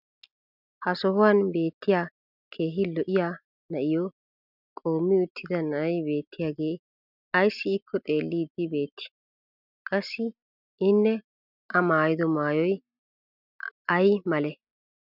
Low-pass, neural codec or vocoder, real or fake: 5.4 kHz; none; real